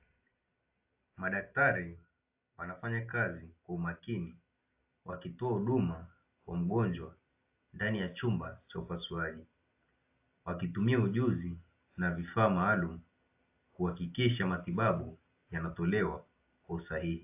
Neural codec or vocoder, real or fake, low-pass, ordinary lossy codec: none; real; 3.6 kHz; AAC, 32 kbps